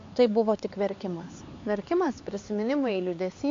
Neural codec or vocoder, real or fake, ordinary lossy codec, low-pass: codec, 16 kHz, 4 kbps, X-Codec, HuBERT features, trained on LibriSpeech; fake; AAC, 48 kbps; 7.2 kHz